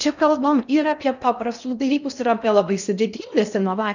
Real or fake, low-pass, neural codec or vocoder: fake; 7.2 kHz; codec, 16 kHz in and 24 kHz out, 0.6 kbps, FocalCodec, streaming, 4096 codes